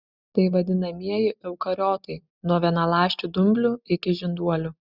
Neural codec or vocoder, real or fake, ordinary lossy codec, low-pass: none; real; Opus, 64 kbps; 5.4 kHz